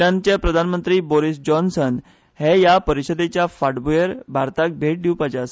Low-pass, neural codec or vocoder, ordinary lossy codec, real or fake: none; none; none; real